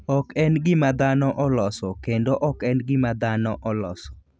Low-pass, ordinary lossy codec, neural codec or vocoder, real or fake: none; none; none; real